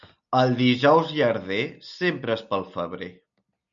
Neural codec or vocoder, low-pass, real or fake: none; 7.2 kHz; real